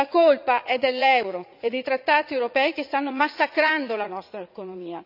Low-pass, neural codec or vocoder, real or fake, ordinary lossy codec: 5.4 kHz; vocoder, 44.1 kHz, 80 mel bands, Vocos; fake; none